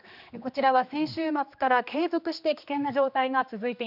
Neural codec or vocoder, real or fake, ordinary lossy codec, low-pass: codec, 16 kHz, 2 kbps, X-Codec, HuBERT features, trained on general audio; fake; none; 5.4 kHz